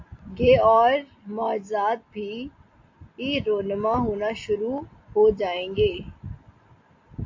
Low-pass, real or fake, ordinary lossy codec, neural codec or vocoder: 7.2 kHz; real; AAC, 48 kbps; none